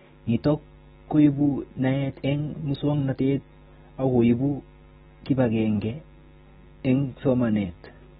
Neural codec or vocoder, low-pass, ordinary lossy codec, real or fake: none; 19.8 kHz; AAC, 16 kbps; real